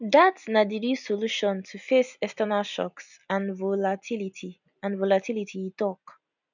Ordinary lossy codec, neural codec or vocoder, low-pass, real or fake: none; none; 7.2 kHz; real